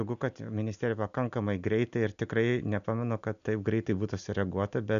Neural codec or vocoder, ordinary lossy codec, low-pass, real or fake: none; MP3, 96 kbps; 7.2 kHz; real